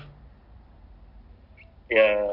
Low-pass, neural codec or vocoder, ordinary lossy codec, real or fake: 5.4 kHz; none; none; real